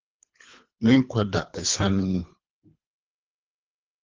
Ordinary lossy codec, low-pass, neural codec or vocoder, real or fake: Opus, 32 kbps; 7.2 kHz; codec, 24 kHz, 3 kbps, HILCodec; fake